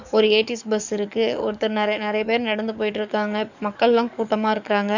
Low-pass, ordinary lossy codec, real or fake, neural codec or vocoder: 7.2 kHz; none; fake; codec, 44.1 kHz, 7.8 kbps, DAC